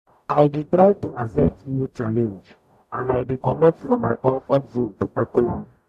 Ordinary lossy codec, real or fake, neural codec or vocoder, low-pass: none; fake; codec, 44.1 kHz, 0.9 kbps, DAC; 14.4 kHz